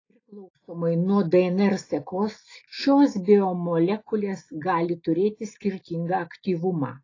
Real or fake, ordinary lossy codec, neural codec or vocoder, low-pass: real; AAC, 32 kbps; none; 7.2 kHz